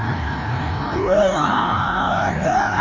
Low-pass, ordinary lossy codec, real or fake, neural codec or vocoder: 7.2 kHz; AAC, 32 kbps; fake; codec, 16 kHz, 1 kbps, FreqCodec, larger model